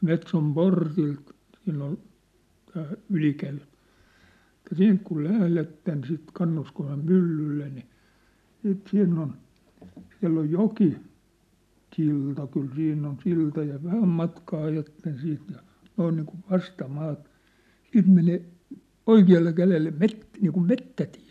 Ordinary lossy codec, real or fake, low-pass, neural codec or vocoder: none; real; 14.4 kHz; none